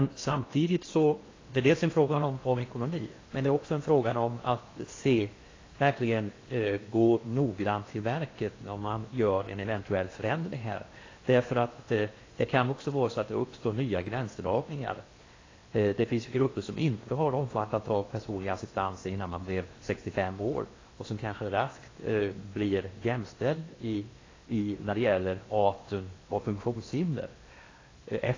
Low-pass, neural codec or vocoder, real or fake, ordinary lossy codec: 7.2 kHz; codec, 16 kHz in and 24 kHz out, 0.8 kbps, FocalCodec, streaming, 65536 codes; fake; AAC, 32 kbps